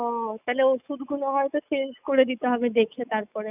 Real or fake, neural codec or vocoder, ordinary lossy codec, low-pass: fake; autoencoder, 48 kHz, 128 numbers a frame, DAC-VAE, trained on Japanese speech; none; 3.6 kHz